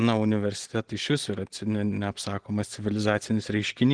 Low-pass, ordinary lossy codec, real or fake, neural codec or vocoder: 9.9 kHz; Opus, 16 kbps; real; none